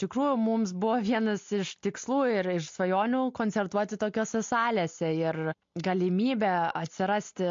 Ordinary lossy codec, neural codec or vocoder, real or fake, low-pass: MP3, 48 kbps; none; real; 7.2 kHz